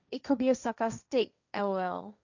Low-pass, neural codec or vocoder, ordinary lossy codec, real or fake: 7.2 kHz; codec, 16 kHz, 1.1 kbps, Voila-Tokenizer; none; fake